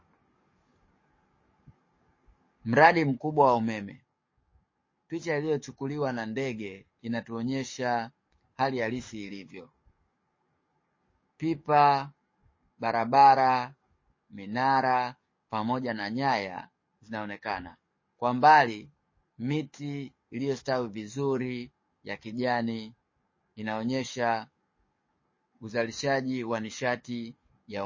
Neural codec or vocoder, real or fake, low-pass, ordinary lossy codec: codec, 44.1 kHz, 7.8 kbps, DAC; fake; 7.2 kHz; MP3, 32 kbps